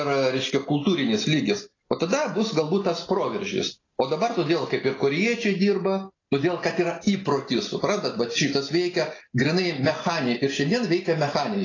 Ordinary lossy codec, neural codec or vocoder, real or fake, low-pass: AAC, 32 kbps; none; real; 7.2 kHz